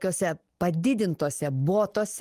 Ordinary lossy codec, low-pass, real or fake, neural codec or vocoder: Opus, 24 kbps; 14.4 kHz; real; none